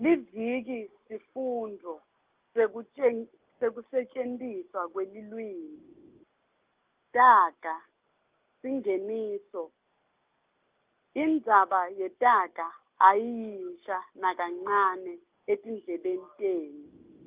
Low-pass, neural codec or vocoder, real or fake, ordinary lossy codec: 3.6 kHz; none; real; Opus, 32 kbps